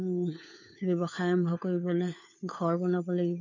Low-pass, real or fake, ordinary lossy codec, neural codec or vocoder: 7.2 kHz; fake; none; codec, 16 kHz, 4 kbps, FunCodec, trained on LibriTTS, 50 frames a second